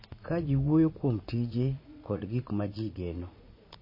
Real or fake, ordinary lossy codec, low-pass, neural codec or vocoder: fake; MP3, 24 kbps; 5.4 kHz; vocoder, 44.1 kHz, 80 mel bands, Vocos